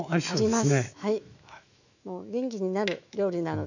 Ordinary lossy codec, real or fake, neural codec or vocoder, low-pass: none; fake; autoencoder, 48 kHz, 128 numbers a frame, DAC-VAE, trained on Japanese speech; 7.2 kHz